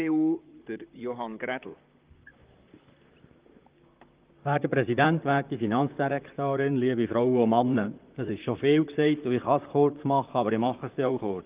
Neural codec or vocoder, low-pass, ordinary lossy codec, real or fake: vocoder, 44.1 kHz, 128 mel bands, Pupu-Vocoder; 3.6 kHz; Opus, 32 kbps; fake